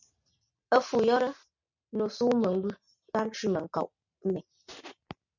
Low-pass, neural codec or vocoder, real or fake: 7.2 kHz; none; real